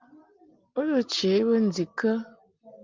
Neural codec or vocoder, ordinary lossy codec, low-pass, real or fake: none; Opus, 24 kbps; 7.2 kHz; real